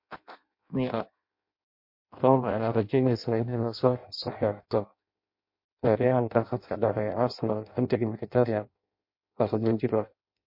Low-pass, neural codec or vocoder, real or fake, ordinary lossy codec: 5.4 kHz; codec, 16 kHz in and 24 kHz out, 0.6 kbps, FireRedTTS-2 codec; fake; MP3, 32 kbps